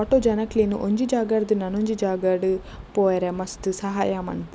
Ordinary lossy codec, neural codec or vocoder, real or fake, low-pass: none; none; real; none